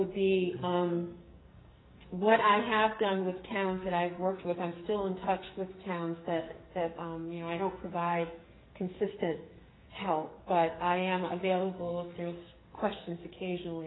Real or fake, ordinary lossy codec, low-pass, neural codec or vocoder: fake; AAC, 16 kbps; 7.2 kHz; codec, 44.1 kHz, 2.6 kbps, SNAC